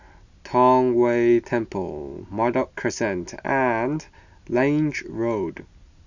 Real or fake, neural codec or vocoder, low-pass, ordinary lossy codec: real; none; 7.2 kHz; none